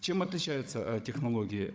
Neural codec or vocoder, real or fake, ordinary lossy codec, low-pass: codec, 16 kHz, 16 kbps, FunCodec, trained on Chinese and English, 50 frames a second; fake; none; none